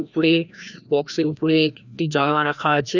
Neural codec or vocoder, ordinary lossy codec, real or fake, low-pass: codec, 16 kHz, 1 kbps, FreqCodec, larger model; none; fake; 7.2 kHz